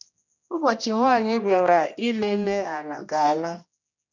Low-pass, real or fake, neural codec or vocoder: 7.2 kHz; fake; codec, 16 kHz, 1 kbps, X-Codec, HuBERT features, trained on general audio